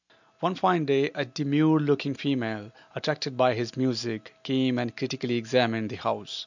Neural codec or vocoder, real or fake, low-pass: none; real; 7.2 kHz